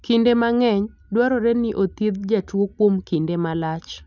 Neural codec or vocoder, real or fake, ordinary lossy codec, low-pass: none; real; none; 7.2 kHz